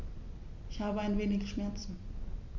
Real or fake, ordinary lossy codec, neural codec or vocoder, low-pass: real; none; none; 7.2 kHz